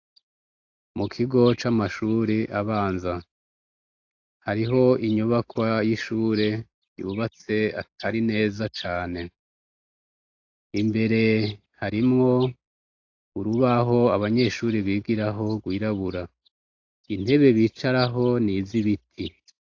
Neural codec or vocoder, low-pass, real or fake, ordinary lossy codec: none; 7.2 kHz; real; AAC, 48 kbps